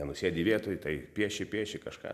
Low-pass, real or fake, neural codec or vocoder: 14.4 kHz; real; none